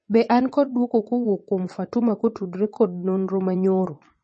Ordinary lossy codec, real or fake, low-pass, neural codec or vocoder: MP3, 32 kbps; fake; 9.9 kHz; vocoder, 22.05 kHz, 80 mel bands, WaveNeXt